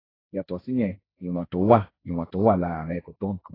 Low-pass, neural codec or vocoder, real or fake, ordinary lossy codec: 5.4 kHz; codec, 16 kHz, 1.1 kbps, Voila-Tokenizer; fake; AAC, 24 kbps